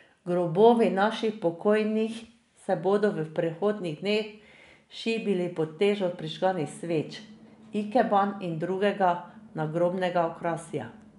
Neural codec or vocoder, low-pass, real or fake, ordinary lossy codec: none; 10.8 kHz; real; none